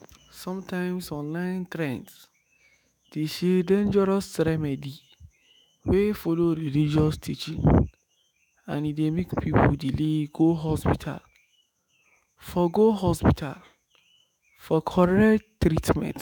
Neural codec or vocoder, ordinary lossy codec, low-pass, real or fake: autoencoder, 48 kHz, 128 numbers a frame, DAC-VAE, trained on Japanese speech; none; none; fake